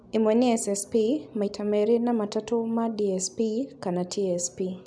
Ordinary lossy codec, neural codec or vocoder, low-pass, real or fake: none; none; none; real